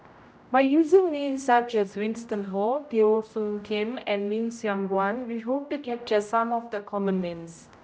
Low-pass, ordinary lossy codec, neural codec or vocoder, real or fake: none; none; codec, 16 kHz, 0.5 kbps, X-Codec, HuBERT features, trained on general audio; fake